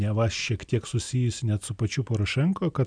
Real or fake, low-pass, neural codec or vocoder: real; 9.9 kHz; none